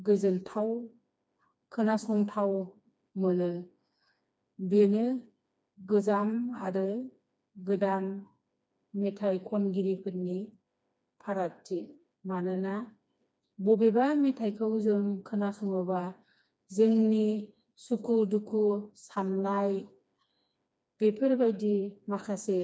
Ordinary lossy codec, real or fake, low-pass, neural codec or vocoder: none; fake; none; codec, 16 kHz, 2 kbps, FreqCodec, smaller model